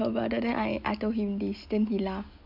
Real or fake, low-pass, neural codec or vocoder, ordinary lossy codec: real; 5.4 kHz; none; none